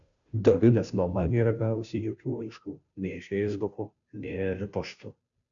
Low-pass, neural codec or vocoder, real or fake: 7.2 kHz; codec, 16 kHz, 0.5 kbps, FunCodec, trained on Chinese and English, 25 frames a second; fake